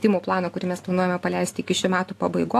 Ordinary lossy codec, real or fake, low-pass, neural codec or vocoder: AAC, 64 kbps; real; 14.4 kHz; none